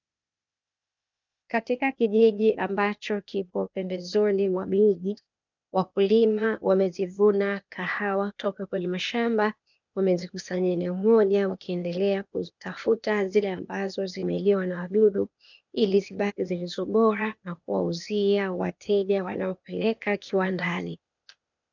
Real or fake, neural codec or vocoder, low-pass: fake; codec, 16 kHz, 0.8 kbps, ZipCodec; 7.2 kHz